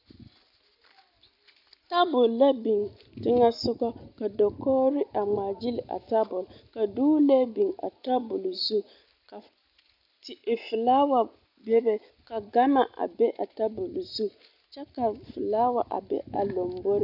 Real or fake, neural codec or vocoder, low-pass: real; none; 5.4 kHz